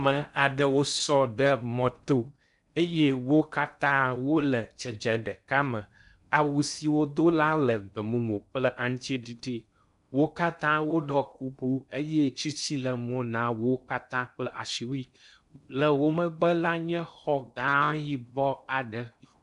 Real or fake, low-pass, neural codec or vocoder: fake; 10.8 kHz; codec, 16 kHz in and 24 kHz out, 0.6 kbps, FocalCodec, streaming, 4096 codes